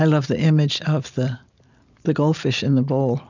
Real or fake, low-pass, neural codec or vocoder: real; 7.2 kHz; none